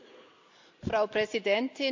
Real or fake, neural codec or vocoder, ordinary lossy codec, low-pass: real; none; MP3, 64 kbps; 7.2 kHz